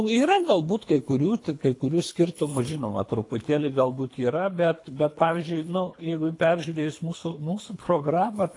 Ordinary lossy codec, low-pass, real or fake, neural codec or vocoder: AAC, 48 kbps; 10.8 kHz; fake; codec, 24 kHz, 3 kbps, HILCodec